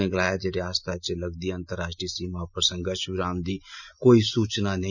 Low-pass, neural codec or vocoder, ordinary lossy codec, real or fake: 7.2 kHz; none; none; real